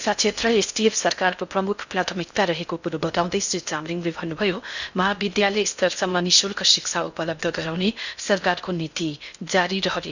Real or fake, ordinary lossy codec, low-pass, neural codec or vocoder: fake; none; 7.2 kHz; codec, 16 kHz in and 24 kHz out, 0.6 kbps, FocalCodec, streaming, 4096 codes